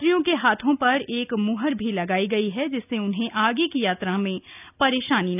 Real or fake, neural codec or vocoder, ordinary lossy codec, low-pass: real; none; none; 3.6 kHz